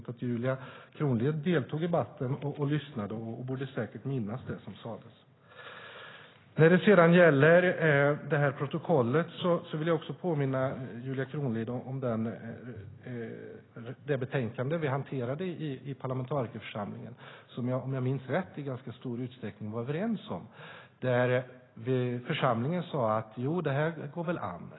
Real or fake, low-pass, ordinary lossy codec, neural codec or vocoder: real; 7.2 kHz; AAC, 16 kbps; none